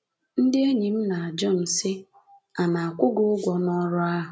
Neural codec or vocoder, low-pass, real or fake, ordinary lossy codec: none; none; real; none